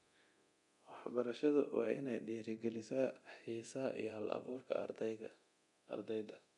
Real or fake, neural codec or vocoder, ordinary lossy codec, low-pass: fake; codec, 24 kHz, 0.9 kbps, DualCodec; none; 10.8 kHz